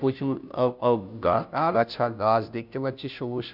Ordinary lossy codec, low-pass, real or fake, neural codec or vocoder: none; 5.4 kHz; fake; codec, 16 kHz, 0.5 kbps, FunCodec, trained on Chinese and English, 25 frames a second